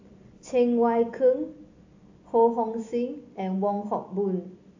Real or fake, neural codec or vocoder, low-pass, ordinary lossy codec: real; none; 7.2 kHz; AAC, 48 kbps